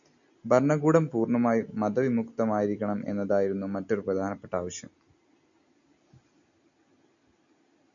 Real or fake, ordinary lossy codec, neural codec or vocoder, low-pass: real; MP3, 96 kbps; none; 7.2 kHz